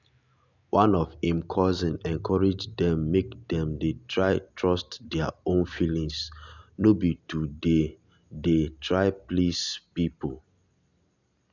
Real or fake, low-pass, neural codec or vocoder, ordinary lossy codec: real; 7.2 kHz; none; none